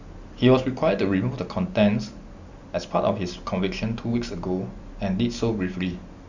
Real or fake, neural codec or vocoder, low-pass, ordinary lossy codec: real; none; 7.2 kHz; Opus, 64 kbps